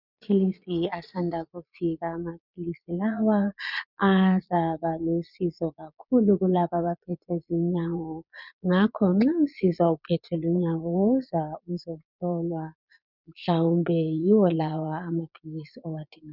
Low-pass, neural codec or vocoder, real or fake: 5.4 kHz; vocoder, 22.05 kHz, 80 mel bands, Vocos; fake